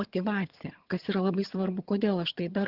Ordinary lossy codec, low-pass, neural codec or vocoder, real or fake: Opus, 16 kbps; 5.4 kHz; vocoder, 22.05 kHz, 80 mel bands, HiFi-GAN; fake